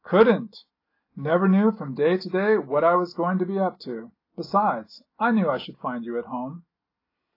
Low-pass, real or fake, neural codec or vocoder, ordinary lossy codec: 5.4 kHz; real; none; AAC, 32 kbps